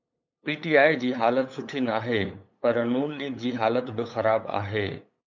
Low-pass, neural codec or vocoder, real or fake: 7.2 kHz; codec, 16 kHz, 8 kbps, FunCodec, trained on LibriTTS, 25 frames a second; fake